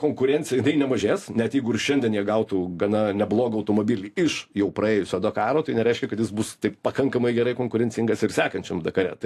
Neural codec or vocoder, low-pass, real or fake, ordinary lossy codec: none; 14.4 kHz; real; AAC, 64 kbps